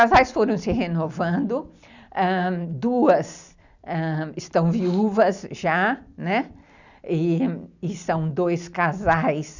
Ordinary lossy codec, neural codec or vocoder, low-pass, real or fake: none; none; 7.2 kHz; real